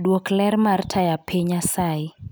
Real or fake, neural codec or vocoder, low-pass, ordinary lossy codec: real; none; none; none